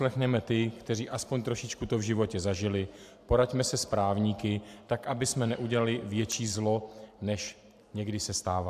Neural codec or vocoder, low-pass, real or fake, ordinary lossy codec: vocoder, 44.1 kHz, 128 mel bands every 512 samples, BigVGAN v2; 14.4 kHz; fake; AAC, 96 kbps